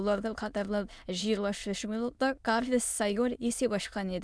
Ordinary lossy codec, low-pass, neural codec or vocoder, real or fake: none; none; autoencoder, 22.05 kHz, a latent of 192 numbers a frame, VITS, trained on many speakers; fake